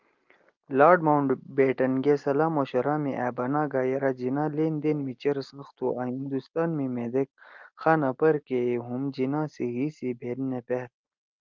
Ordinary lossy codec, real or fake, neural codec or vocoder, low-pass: Opus, 24 kbps; real; none; 7.2 kHz